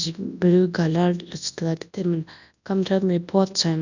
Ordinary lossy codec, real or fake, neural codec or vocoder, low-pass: none; fake; codec, 24 kHz, 0.9 kbps, WavTokenizer, large speech release; 7.2 kHz